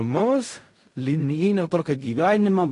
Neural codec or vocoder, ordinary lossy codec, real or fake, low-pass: codec, 16 kHz in and 24 kHz out, 0.4 kbps, LongCat-Audio-Codec, fine tuned four codebook decoder; AAC, 48 kbps; fake; 10.8 kHz